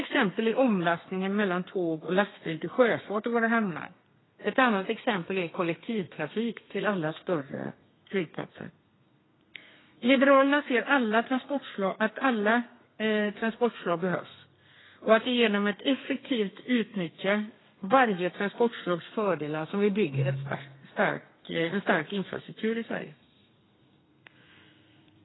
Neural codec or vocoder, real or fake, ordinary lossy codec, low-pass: codec, 32 kHz, 1.9 kbps, SNAC; fake; AAC, 16 kbps; 7.2 kHz